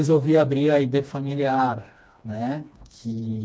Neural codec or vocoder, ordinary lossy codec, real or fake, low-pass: codec, 16 kHz, 2 kbps, FreqCodec, smaller model; none; fake; none